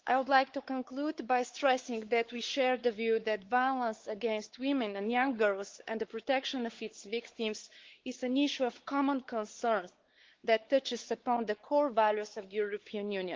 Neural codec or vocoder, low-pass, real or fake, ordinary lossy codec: codec, 16 kHz, 4 kbps, X-Codec, WavLM features, trained on Multilingual LibriSpeech; 7.2 kHz; fake; Opus, 16 kbps